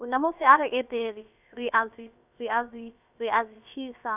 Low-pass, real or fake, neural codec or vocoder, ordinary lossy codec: 3.6 kHz; fake; codec, 16 kHz, about 1 kbps, DyCAST, with the encoder's durations; none